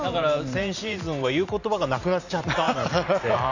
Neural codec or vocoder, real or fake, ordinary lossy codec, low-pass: vocoder, 44.1 kHz, 128 mel bands every 512 samples, BigVGAN v2; fake; none; 7.2 kHz